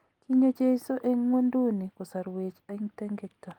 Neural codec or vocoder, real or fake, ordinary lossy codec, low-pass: none; real; Opus, 32 kbps; 19.8 kHz